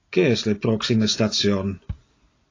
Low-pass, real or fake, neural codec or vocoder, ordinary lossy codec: 7.2 kHz; real; none; AAC, 32 kbps